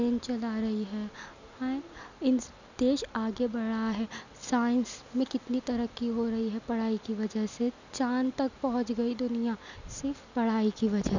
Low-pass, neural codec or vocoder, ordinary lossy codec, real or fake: 7.2 kHz; none; none; real